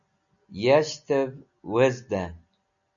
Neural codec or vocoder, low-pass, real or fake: none; 7.2 kHz; real